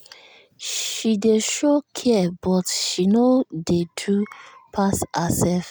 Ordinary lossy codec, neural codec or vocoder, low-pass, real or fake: none; none; none; real